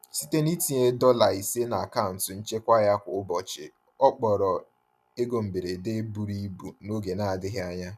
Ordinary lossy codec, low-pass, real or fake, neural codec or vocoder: none; 14.4 kHz; real; none